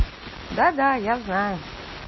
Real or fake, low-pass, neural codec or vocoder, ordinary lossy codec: real; 7.2 kHz; none; MP3, 24 kbps